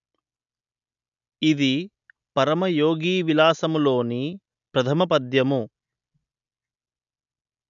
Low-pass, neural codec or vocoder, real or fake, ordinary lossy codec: 7.2 kHz; none; real; none